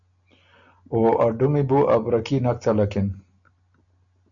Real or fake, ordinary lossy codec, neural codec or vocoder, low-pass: real; MP3, 64 kbps; none; 7.2 kHz